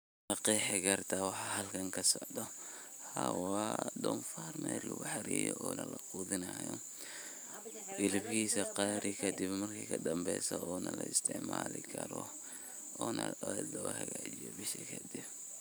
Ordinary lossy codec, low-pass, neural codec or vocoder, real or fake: none; none; vocoder, 44.1 kHz, 128 mel bands every 512 samples, BigVGAN v2; fake